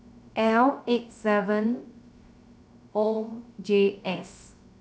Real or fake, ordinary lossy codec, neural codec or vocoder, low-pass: fake; none; codec, 16 kHz, 0.3 kbps, FocalCodec; none